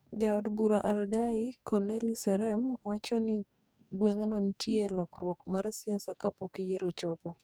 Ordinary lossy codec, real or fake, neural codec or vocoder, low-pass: none; fake; codec, 44.1 kHz, 2.6 kbps, DAC; none